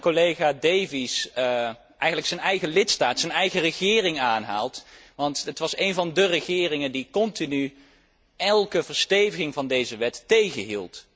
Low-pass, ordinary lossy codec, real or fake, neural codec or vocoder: none; none; real; none